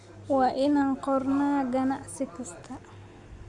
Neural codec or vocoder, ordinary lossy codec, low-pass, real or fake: none; none; 10.8 kHz; real